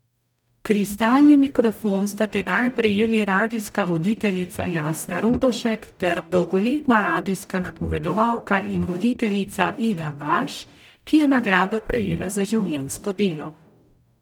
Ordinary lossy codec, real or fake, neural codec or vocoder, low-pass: none; fake; codec, 44.1 kHz, 0.9 kbps, DAC; 19.8 kHz